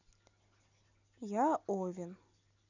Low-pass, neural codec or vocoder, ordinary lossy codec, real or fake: 7.2 kHz; none; none; real